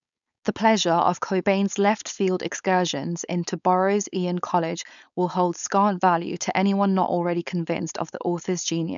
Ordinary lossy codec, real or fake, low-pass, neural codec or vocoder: none; fake; 7.2 kHz; codec, 16 kHz, 4.8 kbps, FACodec